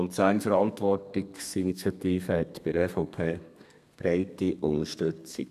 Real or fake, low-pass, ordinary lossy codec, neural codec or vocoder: fake; 14.4 kHz; none; codec, 32 kHz, 1.9 kbps, SNAC